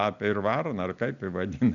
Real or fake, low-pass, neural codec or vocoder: real; 7.2 kHz; none